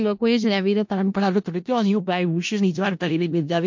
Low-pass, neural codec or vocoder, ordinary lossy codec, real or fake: 7.2 kHz; codec, 16 kHz in and 24 kHz out, 0.4 kbps, LongCat-Audio-Codec, four codebook decoder; MP3, 48 kbps; fake